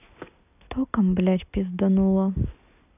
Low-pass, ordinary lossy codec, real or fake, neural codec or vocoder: 3.6 kHz; none; real; none